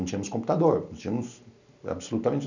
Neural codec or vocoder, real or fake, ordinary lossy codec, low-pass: none; real; none; 7.2 kHz